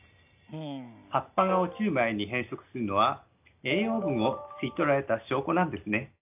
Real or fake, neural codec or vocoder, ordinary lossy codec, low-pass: real; none; AAC, 32 kbps; 3.6 kHz